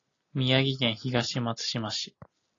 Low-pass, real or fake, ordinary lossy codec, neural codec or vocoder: 7.2 kHz; real; AAC, 32 kbps; none